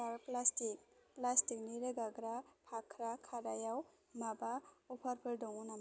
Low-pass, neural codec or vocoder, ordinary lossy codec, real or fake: none; none; none; real